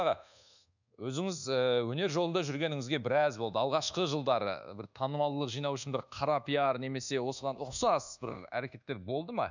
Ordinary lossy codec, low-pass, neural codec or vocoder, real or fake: none; 7.2 kHz; codec, 24 kHz, 1.2 kbps, DualCodec; fake